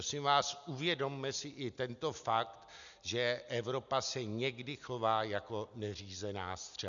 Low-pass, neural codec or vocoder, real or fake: 7.2 kHz; none; real